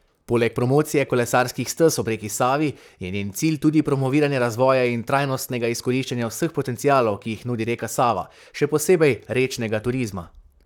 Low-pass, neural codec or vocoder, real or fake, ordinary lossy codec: 19.8 kHz; vocoder, 44.1 kHz, 128 mel bands, Pupu-Vocoder; fake; none